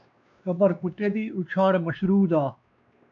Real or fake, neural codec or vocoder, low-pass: fake; codec, 16 kHz, 2 kbps, X-Codec, WavLM features, trained on Multilingual LibriSpeech; 7.2 kHz